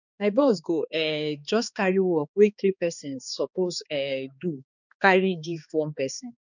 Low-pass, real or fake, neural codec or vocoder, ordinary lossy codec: 7.2 kHz; fake; codec, 16 kHz, 2 kbps, X-Codec, HuBERT features, trained on balanced general audio; none